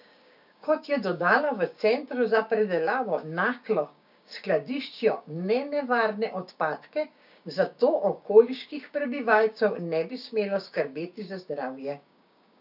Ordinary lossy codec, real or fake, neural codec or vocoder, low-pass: none; fake; codec, 16 kHz, 6 kbps, DAC; 5.4 kHz